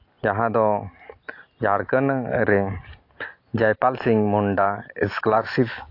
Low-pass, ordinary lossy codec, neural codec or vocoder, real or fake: 5.4 kHz; AAC, 32 kbps; none; real